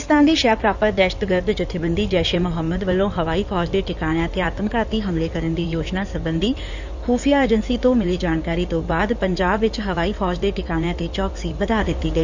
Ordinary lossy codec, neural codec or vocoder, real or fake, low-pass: none; codec, 16 kHz in and 24 kHz out, 2.2 kbps, FireRedTTS-2 codec; fake; 7.2 kHz